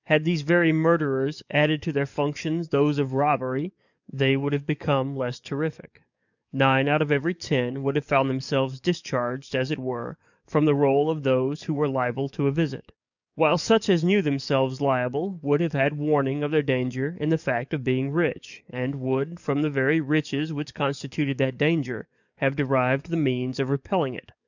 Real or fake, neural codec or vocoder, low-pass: fake; codec, 44.1 kHz, 7.8 kbps, DAC; 7.2 kHz